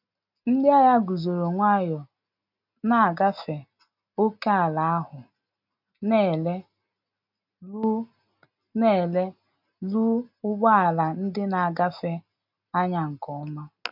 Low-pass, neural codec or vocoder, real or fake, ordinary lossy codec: 5.4 kHz; none; real; none